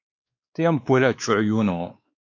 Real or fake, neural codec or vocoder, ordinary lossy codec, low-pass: fake; codec, 16 kHz, 2 kbps, X-Codec, WavLM features, trained on Multilingual LibriSpeech; AAC, 48 kbps; 7.2 kHz